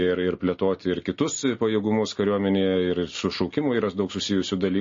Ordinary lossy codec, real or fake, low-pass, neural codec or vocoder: MP3, 32 kbps; real; 7.2 kHz; none